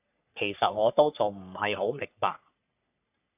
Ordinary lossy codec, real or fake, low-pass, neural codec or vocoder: AAC, 32 kbps; fake; 3.6 kHz; codec, 44.1 kHz, 3.4 kbps, Pupu-Codec